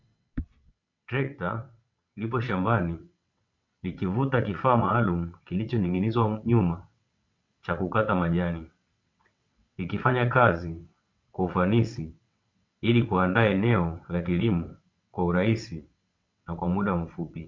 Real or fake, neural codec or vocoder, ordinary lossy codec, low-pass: fake; vocoder, 22.05 kHz, 80 mel bands, WaveNeXt; MP3, 48 kbps; 7.2 kHz